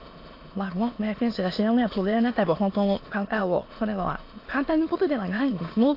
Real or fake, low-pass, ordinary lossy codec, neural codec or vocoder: fake; 5.4 kHz; AAC, 32 kbps; autoencoder, 22.05 kHz, a latent of 192 numbers a frame, VITS, trained on many speakers